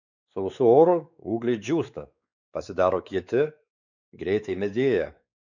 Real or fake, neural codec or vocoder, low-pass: fake; codec, 16 kHz, 4 kbps, X-Codec, WavLM features, trained on Multilingual LibriSpeech; 7.2 kHz